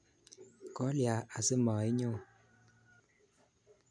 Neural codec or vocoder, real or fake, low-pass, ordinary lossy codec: none; real; 9.9 kHz; none